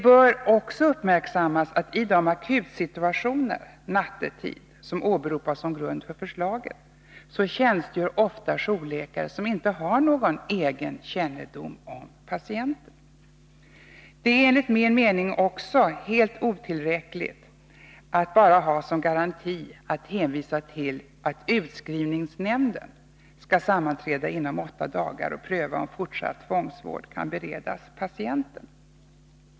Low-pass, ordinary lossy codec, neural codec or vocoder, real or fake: none; none; none; real